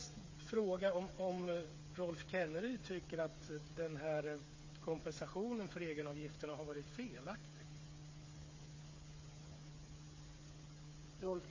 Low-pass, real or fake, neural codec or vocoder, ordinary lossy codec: 7.2 kHz; fake; codec, 16 kHz, 8 kbps, FreqCodec, smaller model; MP3, 32 kbps